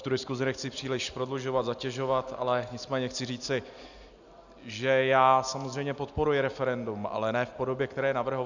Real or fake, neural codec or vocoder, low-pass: real; none; 7.2 kHz